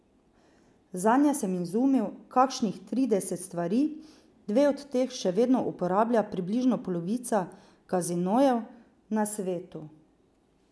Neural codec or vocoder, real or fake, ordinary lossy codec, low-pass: none; real; none; none